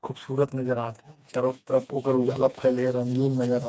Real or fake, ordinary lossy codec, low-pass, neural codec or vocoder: fake; none; none; codec, 16 kHz, 2 kbps, FreqCodec, smaller model